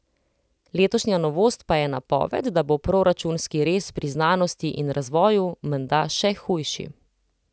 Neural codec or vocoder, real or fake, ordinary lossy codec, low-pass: none; real; none; none